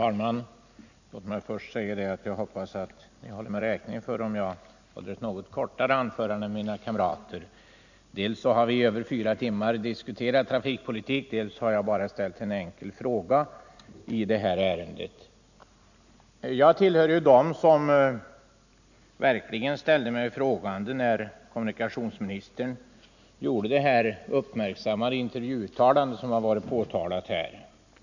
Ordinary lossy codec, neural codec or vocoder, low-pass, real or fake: none; none; 7.2 kHz; real